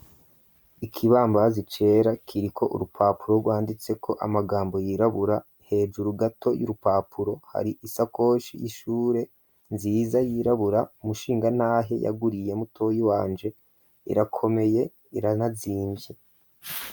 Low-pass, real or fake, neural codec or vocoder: 19.8 kHz; real; none